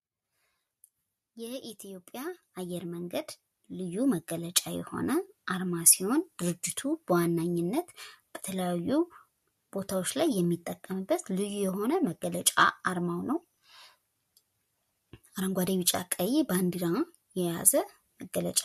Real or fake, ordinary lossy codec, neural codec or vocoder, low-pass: real; MP3, 64 kbps; none; 14.4 kHz